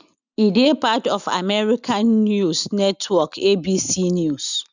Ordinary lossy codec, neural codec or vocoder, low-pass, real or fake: none; none; 7.2 kHz; real